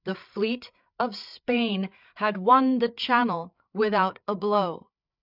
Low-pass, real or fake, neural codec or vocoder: 5.4 kHz; fake; codec, 16 kHz, 8 kbps, FreqCodec, larger model